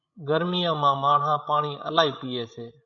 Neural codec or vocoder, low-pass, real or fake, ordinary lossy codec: codec, 16 kHz, 16 kbps, FreqCodec, larger model; 7.2 kHz; fake; MP3, 96 kbps